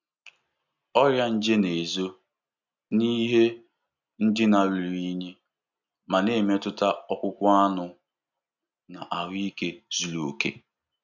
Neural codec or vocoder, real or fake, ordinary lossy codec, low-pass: none; real; none; 7.2 kHz